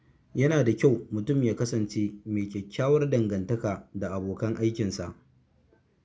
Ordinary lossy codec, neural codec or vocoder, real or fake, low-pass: none; none; real; none